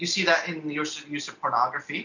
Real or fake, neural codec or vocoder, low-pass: real; none; 7.2 kHz